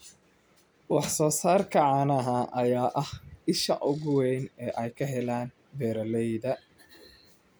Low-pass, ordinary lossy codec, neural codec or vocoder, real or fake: none; none; none; real